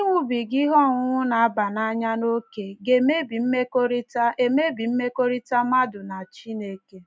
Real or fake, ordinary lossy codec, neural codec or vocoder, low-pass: real; none; none; none